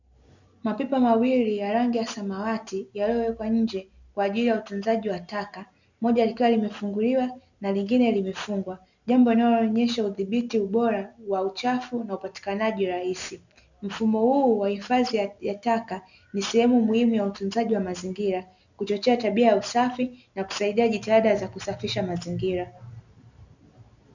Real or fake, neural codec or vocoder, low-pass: real; none; 7.2 kHz